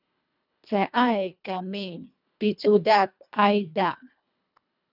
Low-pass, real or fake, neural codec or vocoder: 5.4 kHz; fake; codec, 24 kHz, 1.5 kbps, HILCodec